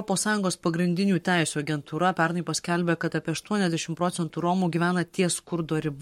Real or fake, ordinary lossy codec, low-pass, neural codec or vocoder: fake; MP3, 64 kbps; 19.8 kHz; codec, 44.1 kHz, 7.8 kbps, Pupu-Codec